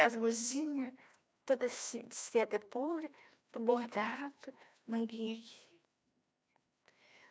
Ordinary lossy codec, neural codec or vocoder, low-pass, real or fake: none; codec, 16 kHz, 1 kbps, FreqCodec, larger model; none; fake